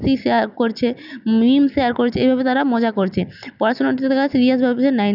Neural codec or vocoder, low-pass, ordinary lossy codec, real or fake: none; 5.4 kHz; none; real